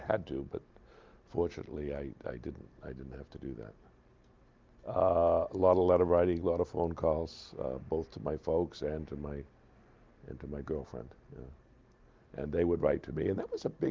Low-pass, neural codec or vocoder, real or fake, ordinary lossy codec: 7.2 kHz; none; real; Opus, 32 kbps